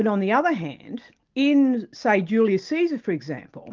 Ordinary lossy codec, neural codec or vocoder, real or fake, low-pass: Opus, 32 kbps; none; real; 7.2 kHz